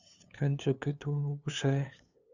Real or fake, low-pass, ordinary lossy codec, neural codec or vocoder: fake; 7.2 kHz; Opus, 64 kbps; codec, 16 kHz, 2 kbps, FunCodec, trained on LibriTTS, 25 frames a second